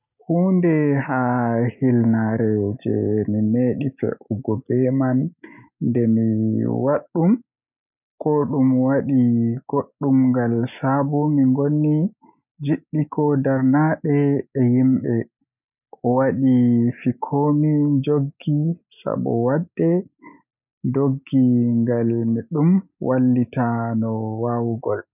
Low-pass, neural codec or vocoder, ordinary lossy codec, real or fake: 3.6 kHz; none; none; real